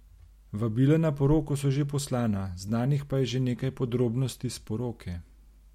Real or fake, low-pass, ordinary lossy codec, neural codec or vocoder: real; 19.8 kHz; MP3, 64 kbps; none